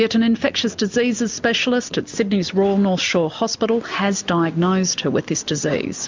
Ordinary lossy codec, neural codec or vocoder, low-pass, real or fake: MP3, 64 kbps; none; 7.2 kHz; real